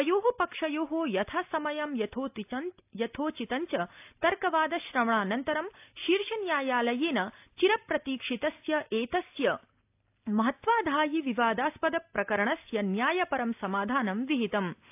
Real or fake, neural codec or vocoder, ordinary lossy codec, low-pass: real; none; AAC, 32 kbps; 3.6 kHz